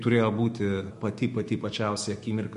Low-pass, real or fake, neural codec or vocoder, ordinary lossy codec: 14.4 kHz; fake; autoencoder, 48 kHz, 128 numbers a frame, DAC-VAE, trained on Japanese speech; MP3, 48 kbps